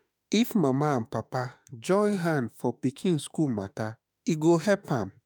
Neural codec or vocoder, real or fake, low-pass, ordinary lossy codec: autoencoder, 48 kHz, 32 numbers a frame, DAC-VAE, trained on Japanese speech; fake; none; none